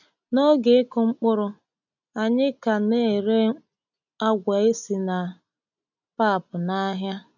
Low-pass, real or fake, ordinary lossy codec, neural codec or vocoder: 7.2 kHz; real; none; none